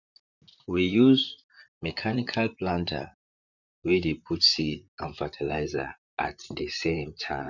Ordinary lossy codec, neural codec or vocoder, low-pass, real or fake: none; vocoder, 44.1 kHz, 128 mel bands, Pupu-Vocoder; 7.2 kHz; fake